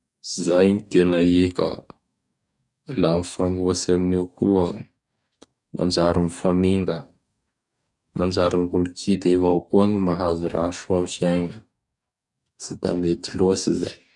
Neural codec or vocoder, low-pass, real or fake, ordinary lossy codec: codec, 44.1 kHz, 2.6 kbps, DAC; 10.8 kHz; fake; none